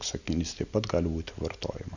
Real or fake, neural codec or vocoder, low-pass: real; none; 7.2 kHz